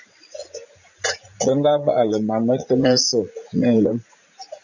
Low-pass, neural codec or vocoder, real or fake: 7.2 kHz; vocoder, 44.1 kHz, 80 mel bands, Vocos; fake